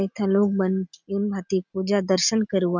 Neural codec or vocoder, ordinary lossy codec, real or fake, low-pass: none; none; real; 7.2 kHz